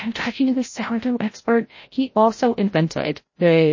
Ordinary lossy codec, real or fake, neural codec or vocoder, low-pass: MP3, 32 kbps; fake; codec, 16 kHz, 0.5 kbps, FreqCodec, larger model; 7.2 kHz